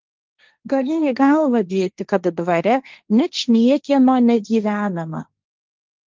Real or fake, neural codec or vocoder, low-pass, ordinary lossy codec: fake; codec, 16 kHz, 1.1 kbps, Voila-Tokenizer; 7.2 kHz; Opus, 24 kbps